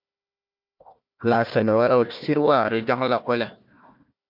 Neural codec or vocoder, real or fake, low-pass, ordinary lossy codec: codec, 16 kHz, 1 kbps, FunCodec, trained on Chinese and English, 50 frames a second; fake; 5.4 kHz; MP3, 48 kbps